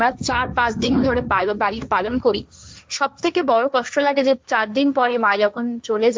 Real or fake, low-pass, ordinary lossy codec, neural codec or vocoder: fake; none; none; codec, 16 kHz, 1.1 kbps, Voila-Tokenizer